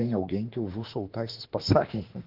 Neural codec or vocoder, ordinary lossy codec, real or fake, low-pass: autoencoder, 48 kHz, 32 numbers a frame, DAC-VAE, trained on Japanese speech; Opus, 16 kbps; fake; 5.4 kHz